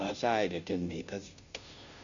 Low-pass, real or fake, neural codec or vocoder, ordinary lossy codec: 7.2 kHz; fake; codec, 16 kHz, 0.5 kbps, FunCodec, trained on Chinese and English, 25 frames a second; none